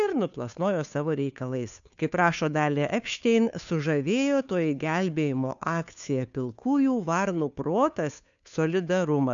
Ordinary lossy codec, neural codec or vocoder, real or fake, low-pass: MP3, 96 kbps; codec, 16 kHz, 2 kbps, FunCodec, trained on Chinese and English, 25 frames a second; fake; 7.2 kHz